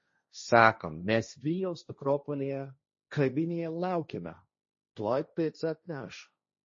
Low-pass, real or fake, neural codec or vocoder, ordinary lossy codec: 7.2 kHz; fake; codec, 16 kHz, 1.1 kbps, Voila-Tokenizer; MP3, 32 kbps